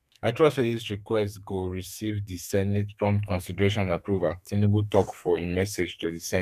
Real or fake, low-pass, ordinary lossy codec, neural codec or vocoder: fake; 14.4 kHz; none; codec, 32 kHz, 1.9 kbps, SNAC